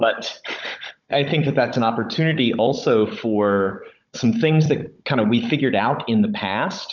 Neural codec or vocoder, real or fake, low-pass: codec, 16 kHz, 16 kbps, FunCodec, trained on Chinese and English, 50 frames a second; fake; 7.2 kHz